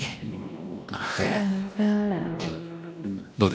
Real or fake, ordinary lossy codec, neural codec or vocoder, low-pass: fake; none; codec, 16 kHz, 1 kbps, X-Codec, WavLM features, trained on Multilingual LibriSpeech; none